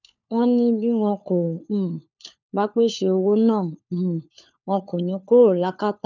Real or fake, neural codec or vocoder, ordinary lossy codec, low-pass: fake; codec, 16 kHz, 4 kbps, FunCodec, trained on LibriTTS, 50 frames a second; none; 7.2 kHz